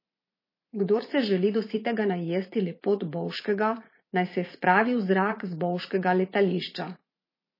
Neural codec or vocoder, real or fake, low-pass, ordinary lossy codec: vocoder, 44.1 kHz, 80 mel bands, Vocos; fake; 5.4 kHz; MP3, 24 kbps